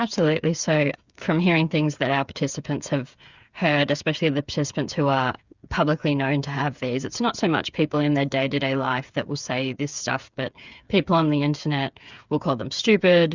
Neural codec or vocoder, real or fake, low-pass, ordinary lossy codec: codec, 16 kHz, 8 kbps, FreqCodec, smaller model; fake; 7.2 kHz; Opus, 64 kbps